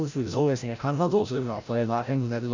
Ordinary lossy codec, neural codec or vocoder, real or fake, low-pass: none; codec, 16 kHz, 0.5 kbps, FreqCodec, larger model; fake; 7.2 kHz